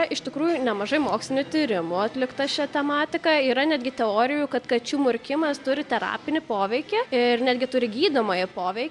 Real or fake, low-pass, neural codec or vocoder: real; 10.8 kHz; none